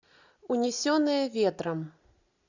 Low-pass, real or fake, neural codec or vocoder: 7.2 kHz; real; none